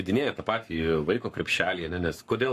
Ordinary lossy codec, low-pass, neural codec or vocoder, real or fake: AAC, 64 kbps; 14.4 kHz; codec, 44.1 kHz, 7.8 kbps, Pupu-Codec; fake